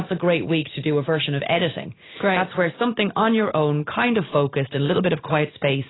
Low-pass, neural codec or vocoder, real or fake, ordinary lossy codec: 7.2 kHz; none; real; AAC, 16 kbps